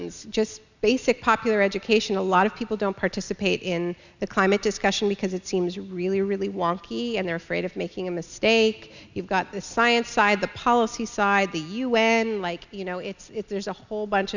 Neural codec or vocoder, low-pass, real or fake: none; 7.2 kHz; real